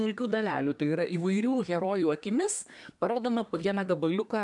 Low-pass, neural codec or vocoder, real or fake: 10.8 kHz; codec, 24 kHz, 1 kbps, SNAC; fake